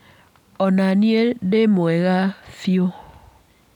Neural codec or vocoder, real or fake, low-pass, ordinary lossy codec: none; real; 19.8 kHz; none